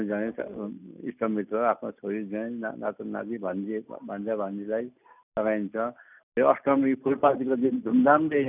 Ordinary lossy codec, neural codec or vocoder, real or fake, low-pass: none; none; real; 3.6 kHz